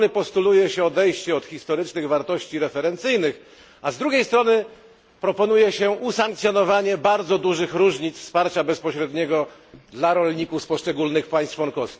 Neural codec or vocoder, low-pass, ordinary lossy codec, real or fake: none; none; none; real